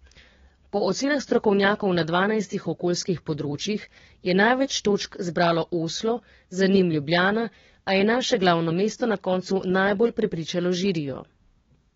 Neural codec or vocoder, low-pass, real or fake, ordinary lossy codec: codec, 16 kHz, 6 kbps, DAC; 7.2 kHz; fake; AAC, 24 kbps